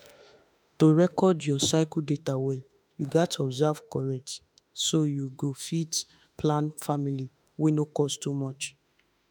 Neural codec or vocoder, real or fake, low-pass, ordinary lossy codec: autoencoder, 48 kHz, 32 numbers a frame, DAC-VAE, trained on Japanese speech; fake; none; none